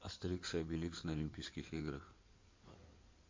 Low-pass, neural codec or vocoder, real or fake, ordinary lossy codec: 7.2 kHz; codec, 16 kHz in and 24 kHz out, 2.2 kbps, FireRedTTS-2 codec; fake; MP3, 48 kbps